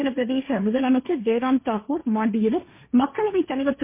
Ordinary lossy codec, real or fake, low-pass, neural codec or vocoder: MP3, 24 kbps; fake; 3.6 kHz; codec, 16 kHz, 1.1 kbps, Voila-Tokenizer